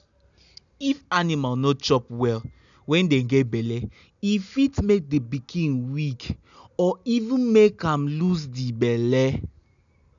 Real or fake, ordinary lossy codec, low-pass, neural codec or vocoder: real; none; 7.2 kHz; none